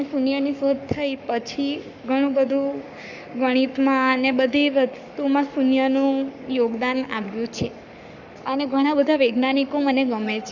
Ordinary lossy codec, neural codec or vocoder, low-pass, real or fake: none; codec, 44.1 kHz, 7.8 kbps, Pupu-Codec; 7.2 kHz; fake